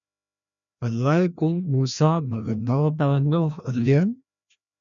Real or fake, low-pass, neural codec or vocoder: fake; 7.2 kHz; codec, 16 kHz, 1 kbps, FreqCodec, larger model